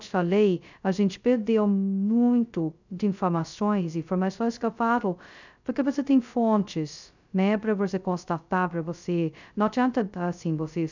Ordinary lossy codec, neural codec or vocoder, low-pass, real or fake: none; codec, 16 kHz, 0.2 kbps, FocalCodec; 7.2 kHz; fake